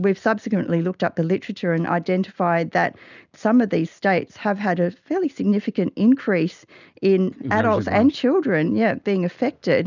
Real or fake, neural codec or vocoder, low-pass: real; none; 7.2 kHz